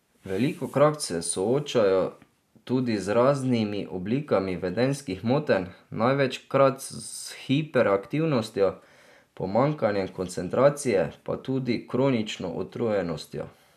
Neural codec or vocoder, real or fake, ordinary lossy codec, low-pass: none; real; none; 14.4 kHz